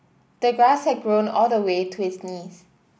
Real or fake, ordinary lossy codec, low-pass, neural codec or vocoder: real; none; none; none